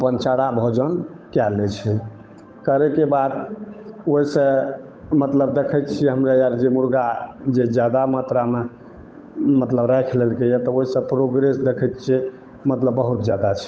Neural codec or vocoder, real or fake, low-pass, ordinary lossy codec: codec, 16 kHz, 8 kbps, FunCodec, trained on Chinese and English, 25 frames a second; fake; none; none